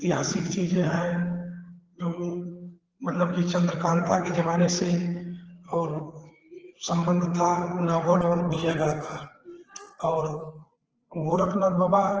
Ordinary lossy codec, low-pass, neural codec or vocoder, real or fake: Opus, 16 kbps; 7.2 kHz; codec, 16 kHz, 4 kbps, FreqCodec, larger model; fake